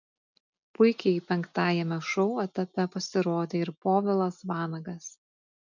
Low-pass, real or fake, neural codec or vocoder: 7.2 kHz; real; none